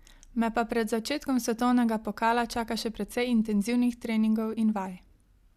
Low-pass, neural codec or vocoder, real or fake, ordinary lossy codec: 14.4 kHz; none; real; Opus, 64 kbps